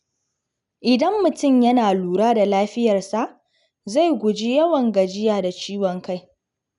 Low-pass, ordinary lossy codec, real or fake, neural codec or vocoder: 10.8 kHz; none; real; none